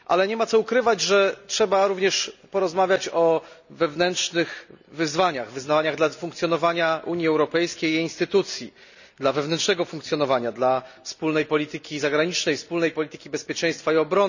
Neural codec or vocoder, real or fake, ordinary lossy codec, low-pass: none; real; none; 7.2 kHz